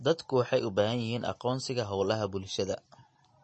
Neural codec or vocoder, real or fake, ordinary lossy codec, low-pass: none; real; MP3, 32 kbps; 9.9 kHz